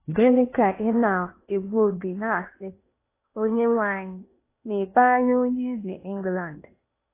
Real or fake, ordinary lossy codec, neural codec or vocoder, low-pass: fake; AAC, 24 kbps; codec, 16 kHz in and 24 kHz out, 0.8 kbps, FocalCodec, streaming, 65536 codes; 3.6 kHz